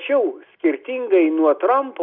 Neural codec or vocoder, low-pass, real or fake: none; 5.4 kHz; real